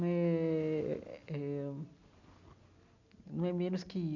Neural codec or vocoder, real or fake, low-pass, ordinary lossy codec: none; real; 7.2 kHz; none